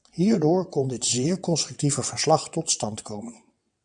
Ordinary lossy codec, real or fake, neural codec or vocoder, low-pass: MP3, 96 kbps; fake; vocoder, 22.05 kHz, 80 mel bands, WaveNeXt; 9.9 kHz